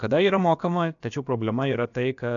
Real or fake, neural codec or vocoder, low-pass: fake; codec, 16 kHz, about 1 kbps, DyCAST, with the encoder's durations; 7.2 kHz